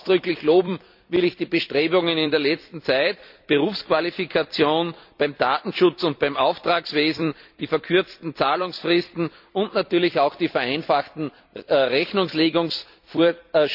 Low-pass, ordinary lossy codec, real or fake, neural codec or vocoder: 5.4 kHz; none; fake; vocoder, 44.1 kHz, 128 mel bands every 256 samples, BigVGAN v2